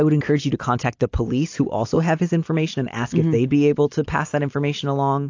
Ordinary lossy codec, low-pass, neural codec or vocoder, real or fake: AAC, 48 kbps; 7.2 kHz; none; real